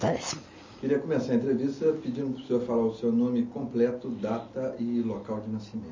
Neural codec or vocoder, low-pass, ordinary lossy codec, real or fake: none; 7.2 kHz; MP3, 32 kbps; real